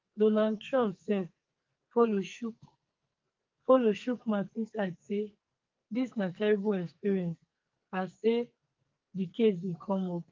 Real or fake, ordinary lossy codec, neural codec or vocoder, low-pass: fake; Opus, 24 kbps; codec, 44.1 kHz, 2.6 kbps, SNAC; 7.2 kHz